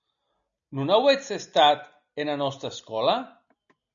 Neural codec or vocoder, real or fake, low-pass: none; real; 7.2 kHz